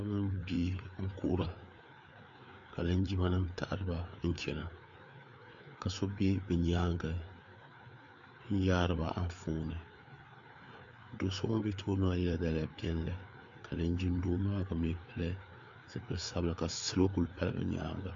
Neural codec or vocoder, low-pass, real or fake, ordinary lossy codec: codec, 16 kHz, 4 kbps, FreqCodec, larger model; 7.2 kHz; fake; MP3, 64 kbps